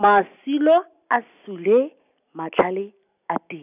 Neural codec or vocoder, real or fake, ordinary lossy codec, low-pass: none; real; none; 3.6 kHz